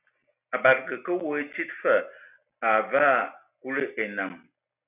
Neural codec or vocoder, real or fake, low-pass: none; real; 3.6 kHz